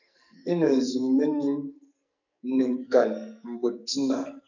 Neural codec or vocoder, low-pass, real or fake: codec, 44.1 kHz, 2.6 kbps, SNAC; 7.2 kHz; fake